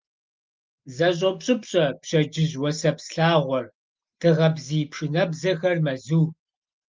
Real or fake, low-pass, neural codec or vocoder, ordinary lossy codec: real; 7.2 kHz; none; Opus, 32 kbps